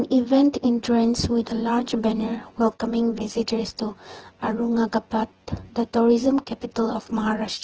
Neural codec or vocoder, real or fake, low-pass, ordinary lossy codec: vocoder, 24 kHz, 100 mel bands, Vocos; fake; 7.2 kHz; Opus, 16 kbps